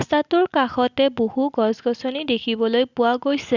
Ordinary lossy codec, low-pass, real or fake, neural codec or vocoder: Opus, 64 kbps; 7.2 kHz; real; none